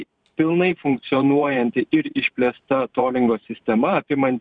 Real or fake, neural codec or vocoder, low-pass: fake; vocoder, 44.1 kHz, 128 mel bands every 512 samples, BigVGAN v2; 9.9 kHz